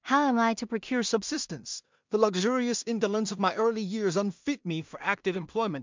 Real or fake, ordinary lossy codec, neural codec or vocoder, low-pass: fake; MP3, 64 kbps; codec, 16 kHz in and 24 kHz out, 0.4 kbps, LongCat-Audio-Codec, two codebook decoder; 7.2 kHz